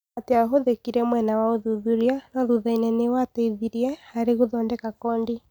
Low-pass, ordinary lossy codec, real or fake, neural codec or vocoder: none; none; real; none